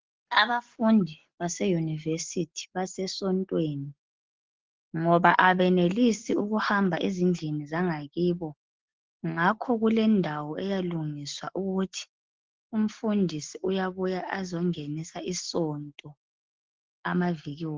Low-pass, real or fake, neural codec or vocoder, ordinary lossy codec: 7.2 kHz; real; none; Opus, 16 kbps